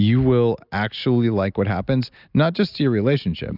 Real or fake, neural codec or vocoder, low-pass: real; none; 5.4 kHz